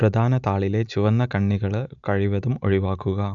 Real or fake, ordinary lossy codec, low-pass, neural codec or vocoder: real; none; 7.2 kHz; none